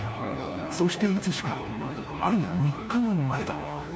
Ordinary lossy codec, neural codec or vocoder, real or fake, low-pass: none; codec, 16 kHz, 1 kbps, FunCodec, trained on LibriTTS, 50 frames a second; fake; none